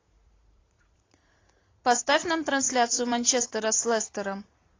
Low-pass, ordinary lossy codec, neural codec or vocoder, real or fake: 7.2 kHz; AAC, 32 kbps; vocoder, 44.1 kHz, 80 mel bands, Vocos; fake